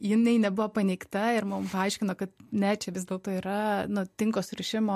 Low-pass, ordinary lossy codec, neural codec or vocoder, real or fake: 14.4 kHz; MP3, 64 kbps; none; real